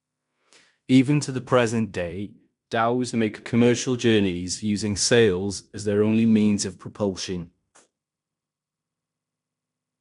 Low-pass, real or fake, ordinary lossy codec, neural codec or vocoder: 10.8 kHz; fake; none; codec, 16 kHz in and 24 kHz out, 0.9 kbps, LongCat-Audio-Codec, fine tuned four codebook decoder